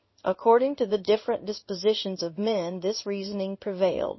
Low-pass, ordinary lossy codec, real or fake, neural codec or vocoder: 7.2 kHz; MP3, 24 kbps; fake; codec, 16 kHz in and 24 kHz out, 1 kbps, XY-Tokenizer